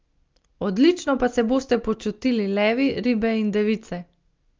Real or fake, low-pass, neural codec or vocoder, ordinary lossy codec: real; 7.2 kHz; none; Opus, 24 kbps